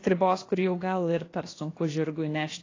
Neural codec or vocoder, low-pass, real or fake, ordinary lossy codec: codec, 16 kHz, about 1 kbps, DyCAST, with the encoder's durations; 7.2 kHz; fake; AAC, 32 kbps